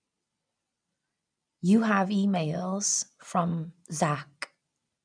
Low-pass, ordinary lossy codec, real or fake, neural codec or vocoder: 9.9 kHz; none; real; none